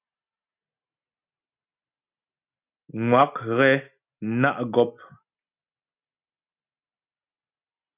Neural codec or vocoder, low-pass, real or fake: none; 3.6 kHz; real